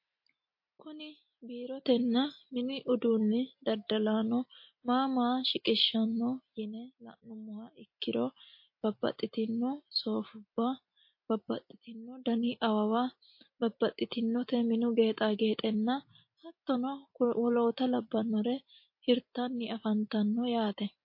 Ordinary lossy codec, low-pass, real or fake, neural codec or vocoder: MP3, 32 kbps; 5.4 kHz; real; none